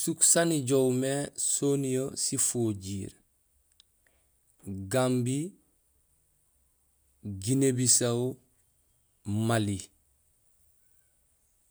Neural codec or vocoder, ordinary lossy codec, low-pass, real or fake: none; none; none; real